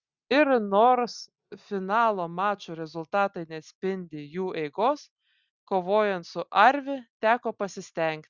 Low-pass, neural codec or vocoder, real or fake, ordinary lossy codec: 7.2 kHz; none; real; Opus, 64 kbps